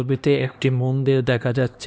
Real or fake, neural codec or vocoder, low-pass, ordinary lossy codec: fake; codec, 16 kHz, 1 kbps, X-Codec, HuBERT features, trained on LibriSpeech; none; none